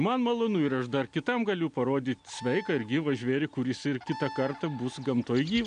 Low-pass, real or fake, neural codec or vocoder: 9.9 kHz; real; none